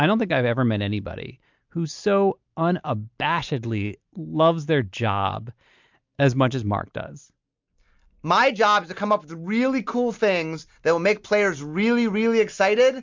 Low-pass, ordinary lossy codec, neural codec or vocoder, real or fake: 7.2 kHz; MP3, 64 kbps; none; real